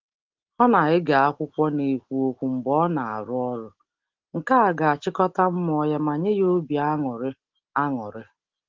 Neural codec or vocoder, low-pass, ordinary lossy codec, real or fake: none; 7.2 kHz; Opus, 16 kbps; real